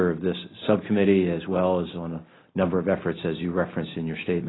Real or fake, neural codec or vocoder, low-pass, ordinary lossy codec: real; none; 7.2 kHz; AAC, 16 kbps